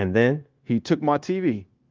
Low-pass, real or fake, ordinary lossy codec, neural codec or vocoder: 7.2 kHz; fake; Opus, 32 kbps; codec, 16 kHz, 0.9 kbps, LongCat-Audio-Codec